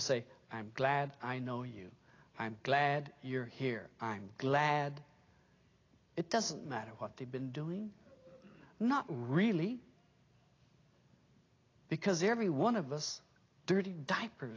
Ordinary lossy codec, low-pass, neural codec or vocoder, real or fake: AAC, 32 kbps; 7.2 kHz; none; real